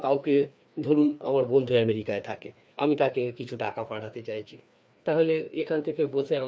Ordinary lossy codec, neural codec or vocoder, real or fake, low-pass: none; codec, 16 kHz, 2 kbps, FreqCodec, larger model; fake; none